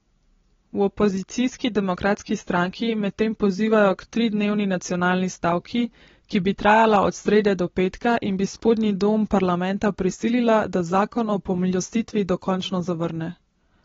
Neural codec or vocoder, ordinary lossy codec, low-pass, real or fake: none; AAC, 24 kbps; 7.2 kHz; real